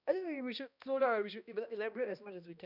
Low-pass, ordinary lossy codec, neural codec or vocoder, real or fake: 5.4 kHz; none; codec, 16 kHz, 1 kbps, X-Codec, HuBERT features, trained on balanced general audio; fake